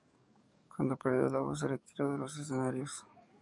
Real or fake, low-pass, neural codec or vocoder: fake; 10.8 kHz; codec, 44.1 kHz, 7.8 kbps, DAC